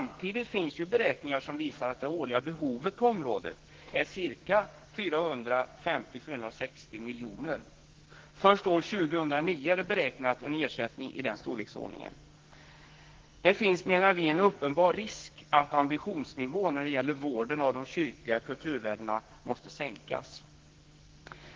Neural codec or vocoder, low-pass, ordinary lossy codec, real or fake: codec, 44.1 kHz, 2.6 kbps, SNAC; 7.2 kHz; Opus, 16 kbps; fake